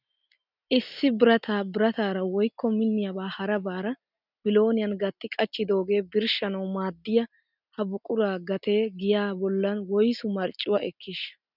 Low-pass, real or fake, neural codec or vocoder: 5.4 kHz; real; none